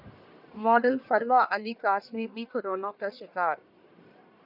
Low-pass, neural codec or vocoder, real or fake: 5.4 kHz; codec, 44.1 kHz, 1.7 kbps, Pupu-Codec; fake